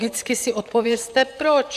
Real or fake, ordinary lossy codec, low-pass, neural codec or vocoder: fake; AAC, 96 kbps; 14.4 kHz; vocoder, 44.1 kHz, 128 mel bands, Pupu-Vocoder